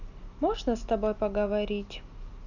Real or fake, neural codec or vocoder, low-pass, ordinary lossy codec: real; none; 7.2 kHz; none